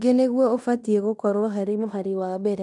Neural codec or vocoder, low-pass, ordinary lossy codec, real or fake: codec, 16 kHz in and 24 kHz out, 0.9 kbps, LongCat-Audio-Codec, fine tuned four codebook decoder; 10.8 kHz; none; fake